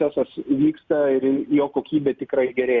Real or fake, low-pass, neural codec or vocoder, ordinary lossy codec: real; 7.2 kHz; none; Opus, 64 kbps